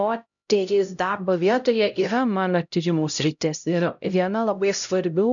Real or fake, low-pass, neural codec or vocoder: fake; 7.2 kHz; codec, 16 kHz, 0.5 kbps, X-Codec, HuBERT features, trained on LibriSpeech